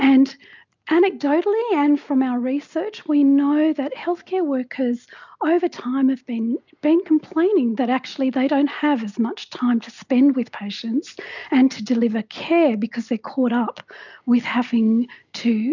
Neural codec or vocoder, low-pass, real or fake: none; 7.2 kHz; real